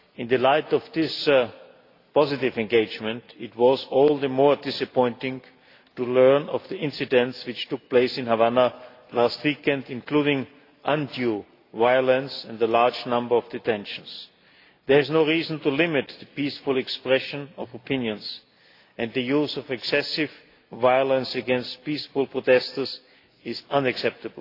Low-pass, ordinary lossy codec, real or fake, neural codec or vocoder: 5.4 kHz; AAC, 32 kbps; real; none